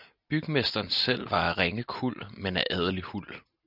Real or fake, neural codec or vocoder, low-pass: real; none; 5.4 kHz